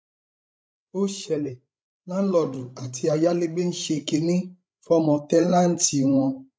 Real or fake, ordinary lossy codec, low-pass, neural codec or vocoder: fake; none; none; codec, 16 kHz, 16 kbps, FreqCodec, larger model